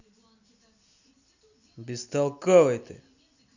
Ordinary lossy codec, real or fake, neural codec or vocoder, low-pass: none; real; none; 7.2 kHz